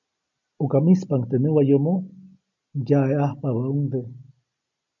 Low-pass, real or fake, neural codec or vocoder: 7.2 kHz; real; none